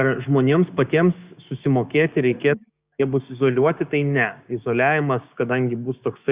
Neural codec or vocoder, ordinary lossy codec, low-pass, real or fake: codec, 16 kHz, 6 kbps, DAC; Opus, 64 kbps; 3.6 kHz; fake